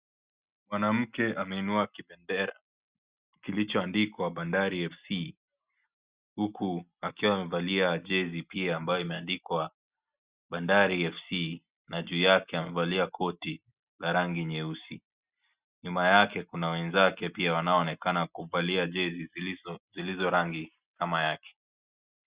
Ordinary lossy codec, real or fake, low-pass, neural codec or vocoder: Opus, 64 kbps; real; 3.6 kHz; none